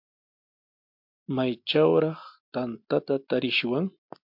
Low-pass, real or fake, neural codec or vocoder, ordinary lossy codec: 5.4 kHz; real; none; MP3, 48 kbps